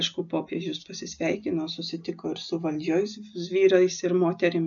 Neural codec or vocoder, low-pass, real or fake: none; 7.2 kHz; real